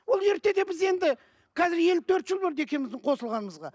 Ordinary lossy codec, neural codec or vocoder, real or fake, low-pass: none; none; real; none